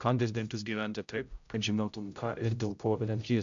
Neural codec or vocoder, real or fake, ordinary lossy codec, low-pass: codec, 16 kHz, 0.5 kbps, X-Codec, HuBERT features, trained on general audio; fake; AAC, 64 kbps; 7.2 kHz